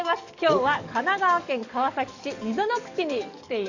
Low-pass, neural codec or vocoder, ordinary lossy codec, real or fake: 7.2 kHz; codec, 44.1 kHz, 7.8 kbps, DAC; none; fake